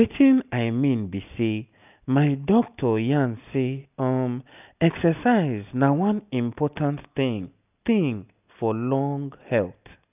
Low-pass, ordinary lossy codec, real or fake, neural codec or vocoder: 3.6 kHz; none; real; none